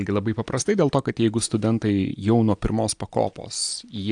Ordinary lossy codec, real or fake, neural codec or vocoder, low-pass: AAC, 64 kbps; real; none; 9.9 kHz